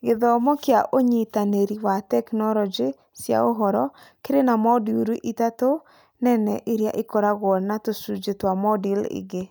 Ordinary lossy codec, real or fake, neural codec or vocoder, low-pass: none; real; none; none